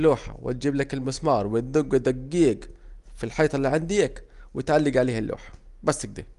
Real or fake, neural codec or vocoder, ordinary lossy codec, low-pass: real; none; Opus, 32 kbps; 10.8 kHz